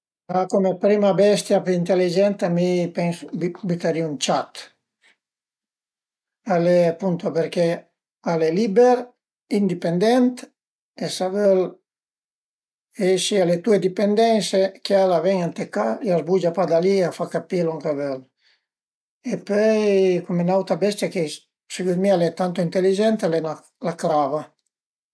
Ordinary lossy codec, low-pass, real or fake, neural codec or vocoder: none; none; real; none